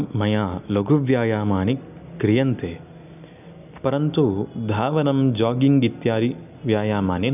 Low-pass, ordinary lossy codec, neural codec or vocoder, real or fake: 3.6 kHz; none; none; real